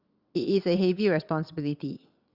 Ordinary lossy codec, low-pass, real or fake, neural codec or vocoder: Opus, 64 kbps; 5.4 kHz; fake; vocoder, 22.05 kHz, 80 mel bands, WaveNeXt